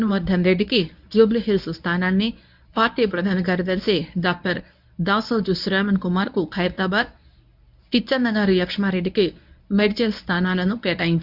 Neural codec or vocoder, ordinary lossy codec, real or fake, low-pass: codec, 24 kHz, 0.9 kbps, WavTokenizer, medium speech release version 1; none; fake; 5.4 kHz